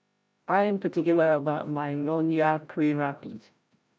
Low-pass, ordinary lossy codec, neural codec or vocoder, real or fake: none; none; codec, 16 kHz, 0.5 kbps, FreqCodec, larger model; fake